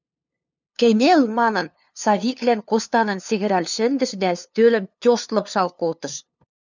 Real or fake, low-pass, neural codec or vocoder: fake; 7.2 kHz; codec, 16 kHz, 2 kbps, FunCodec, trained on LibriTTS, 25 frames a second